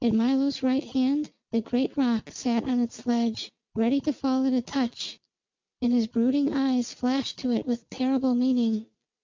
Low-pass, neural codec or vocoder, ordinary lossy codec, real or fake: 7.2 kHz; none; MP3, 64 kbps; real